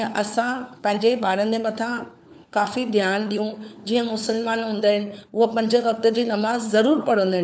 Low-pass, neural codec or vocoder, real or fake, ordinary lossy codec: none; codec, 16 kHz, 4 kbps, FunCodec, trained on LibriTTS, 50 frames a second; fake; none